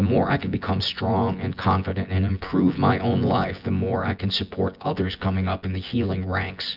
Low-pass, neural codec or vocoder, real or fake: 5.4 kHz; vocoder, 24 kHz, 100 mel bands, Vocos; fake